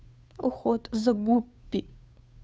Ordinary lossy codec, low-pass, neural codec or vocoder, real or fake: none; none; codec, 16 kHz, 2 kbps, FunCodec, trained on Chinese and English, 25 frames a second; fake